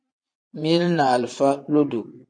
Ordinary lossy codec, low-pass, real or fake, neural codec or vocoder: MP3, 48 kbps; 9.9 kHz; fake; vocoder, 22.05 kHz, 80 mel bands, Vocos